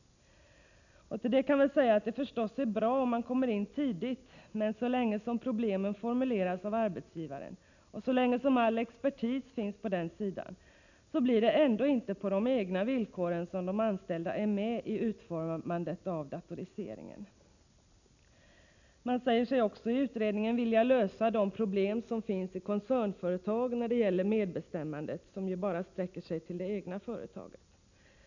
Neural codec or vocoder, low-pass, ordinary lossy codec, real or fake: none; 7.2 kHz; none; real